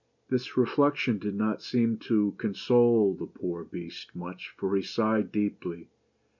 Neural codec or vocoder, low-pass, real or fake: none; 7.2 kHz; real